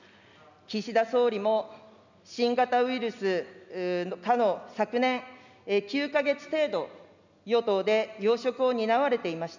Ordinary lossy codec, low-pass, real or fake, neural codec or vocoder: none; 7.2 kHz; real; none